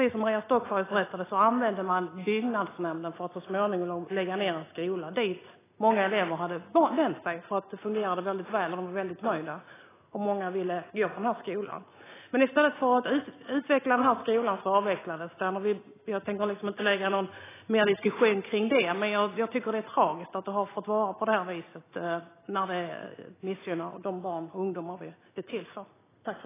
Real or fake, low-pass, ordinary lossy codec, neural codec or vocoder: real; 3.6 kHz; AAC, 16 kbps; none